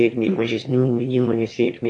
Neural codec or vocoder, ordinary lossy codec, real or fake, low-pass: autoencoder, 22.05 kHz, a latent of 192 numbers a frame, VITS, trained on one speaker; AAC, 48 kbps; fake; 9.9 kHz